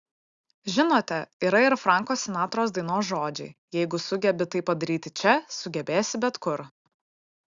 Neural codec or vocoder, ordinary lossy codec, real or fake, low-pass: none; Opus, 64 kbps; real; 7.2 kHz